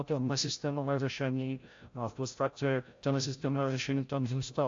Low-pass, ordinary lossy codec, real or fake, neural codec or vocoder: 7.2 kHz; AAC, 48 kbps; fake; codec, 16 kHz, 0.5 kbps, FreqCodec, larger model